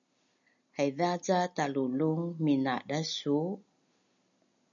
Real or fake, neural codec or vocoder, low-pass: real; none; 7.2 kHz